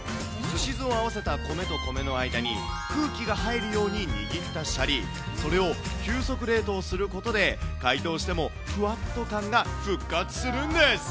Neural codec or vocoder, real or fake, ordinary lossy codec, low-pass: none; real; none; none